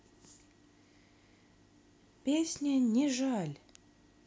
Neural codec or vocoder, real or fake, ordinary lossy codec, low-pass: none; real; none; none